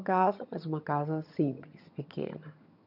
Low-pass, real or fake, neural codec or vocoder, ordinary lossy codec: 5.4 kHz; fake; vocoder, 22.05 kHz, 80 mel bands, HiFi-GAN; none